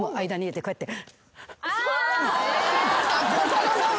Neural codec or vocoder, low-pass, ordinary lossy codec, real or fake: none; none; none; real